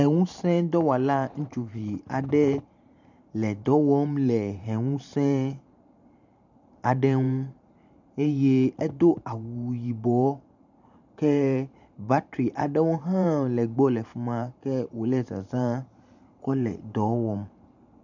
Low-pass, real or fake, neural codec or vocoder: 7.2 kHz; fake; vocoder, 44.1 kHz, 128 mel bands every 512 samples, BigVGAN v2